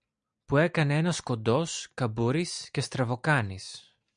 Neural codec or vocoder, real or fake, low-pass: none; real; 9.9 kHz